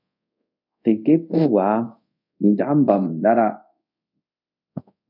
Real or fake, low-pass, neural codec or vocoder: fake; 5.4 kHz; codec, 24 kHz, 0.5 kbps, DualCodec